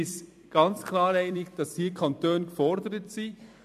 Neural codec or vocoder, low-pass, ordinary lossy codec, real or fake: vocoder, 44.1 kHz, 128 mel bands every 256 samples, BigVGAN v2; 14.4 kHz; AAC, 96 kbps; fake